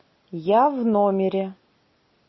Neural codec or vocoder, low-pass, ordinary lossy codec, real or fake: none; 7.2 kHz; MP3, 24 kbps; real